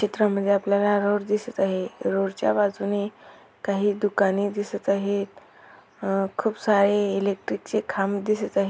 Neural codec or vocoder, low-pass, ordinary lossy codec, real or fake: none; none; none; real